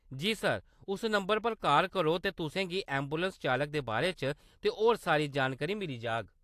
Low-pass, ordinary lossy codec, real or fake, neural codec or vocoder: 14.4 kHz; AAC, 64 kbps; real; none